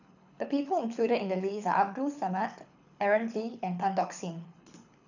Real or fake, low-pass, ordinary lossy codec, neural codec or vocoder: fake; 7.2 kHz; none; codec, 24 kHz, 6 kbps, HILCodec